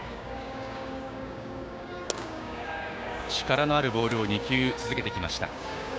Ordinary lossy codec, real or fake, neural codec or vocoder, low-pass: none; fake; codec, 16 kHz, 6 kbps, DAC; none